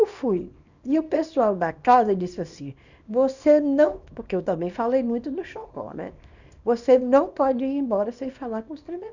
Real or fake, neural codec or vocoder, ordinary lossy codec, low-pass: fake; codec, 24 kHz, 0.9 kbps, WavTokenizer, small release; none; 7.2 kHz